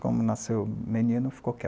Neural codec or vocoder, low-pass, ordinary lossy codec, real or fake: none; none; none; real